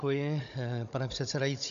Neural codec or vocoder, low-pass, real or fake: codec, 16 kHz, 16 kbps, FunCodec, trained on Chinese and English, 50 frames a second; 7.2 kHz; fake